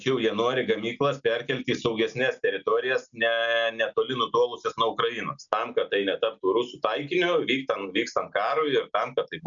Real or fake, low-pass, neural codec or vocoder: real; 7.2 kHz; none